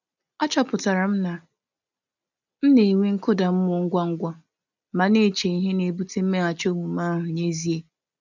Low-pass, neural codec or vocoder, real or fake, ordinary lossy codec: 7.2 kHz; none; real; none